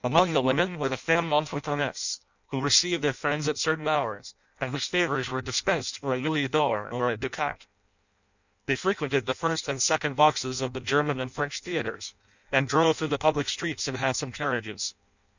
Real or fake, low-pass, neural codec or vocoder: fake; 7.2 kHz; codec, 16 kHz in and 24 kHz out, 0.6 kbps, FireRedTTS-2 codec